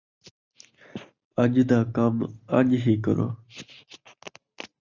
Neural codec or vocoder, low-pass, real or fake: none; 7.2 kHz; real